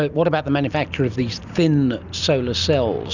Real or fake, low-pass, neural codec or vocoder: real; 7.2 kHz; none